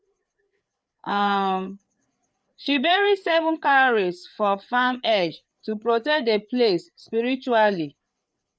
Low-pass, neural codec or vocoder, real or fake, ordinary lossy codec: none; codec, 16 kHz, 4 kbps, FreqCodec, larger model; fake; none